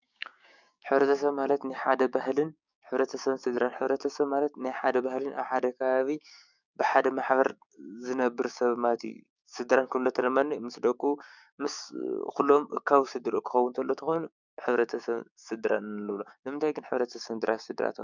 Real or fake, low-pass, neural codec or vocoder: fake; 7.2 kHz; codec, 16 kHz, 6 kbps, DAC